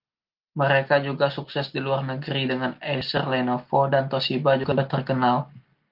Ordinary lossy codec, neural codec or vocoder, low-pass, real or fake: Opus, 24 kbps; none; 5.4 kHz; real